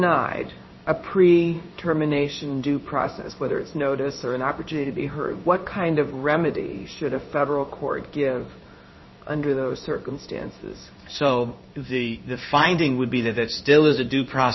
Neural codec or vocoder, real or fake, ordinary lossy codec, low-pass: codec, 16 kHz in and 24 kHz out, 1 kbps, XY-Tokenizer; fake; MP3, 24 kbps; 7.2 kHz